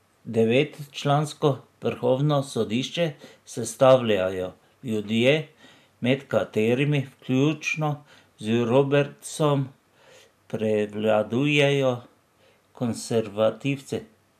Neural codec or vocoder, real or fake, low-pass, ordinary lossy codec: none; real; 14.4 kHz; none